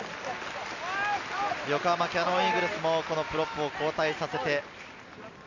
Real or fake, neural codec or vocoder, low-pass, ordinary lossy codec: real; none; 7.2 kHz; none